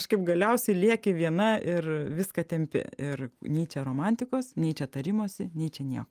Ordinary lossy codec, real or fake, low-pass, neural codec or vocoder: Opus, 32 kbps; real; 14.4 kHz; none